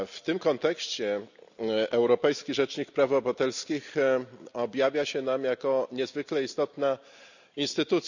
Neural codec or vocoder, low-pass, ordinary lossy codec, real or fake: none; 7.2 kHz; none; real